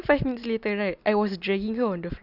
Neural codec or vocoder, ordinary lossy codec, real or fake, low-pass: none; Opus, 64 kbps; real; 5.4 kHz